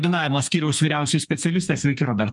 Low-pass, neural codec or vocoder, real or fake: 10.8 kHz; codec, 32 kHz, 1.9 kbps, SNAC; fake